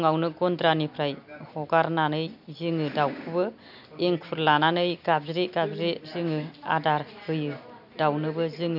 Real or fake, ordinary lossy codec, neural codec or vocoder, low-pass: real; none; none; 5.4 kHz